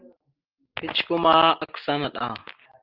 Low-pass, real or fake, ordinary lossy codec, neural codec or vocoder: 5.4 kHz; real; Opus, 32 kbps; none